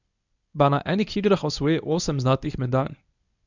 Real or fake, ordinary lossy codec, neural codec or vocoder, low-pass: fake; none; codec, 24 kHz, 0.9 kbps, WavTokenizer, medium speech release version 1; 7.2 kHz